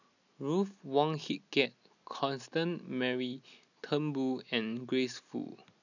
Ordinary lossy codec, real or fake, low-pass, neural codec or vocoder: none; real; 7.2 kHz; none